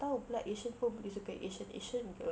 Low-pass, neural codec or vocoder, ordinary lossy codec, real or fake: none; none; none; real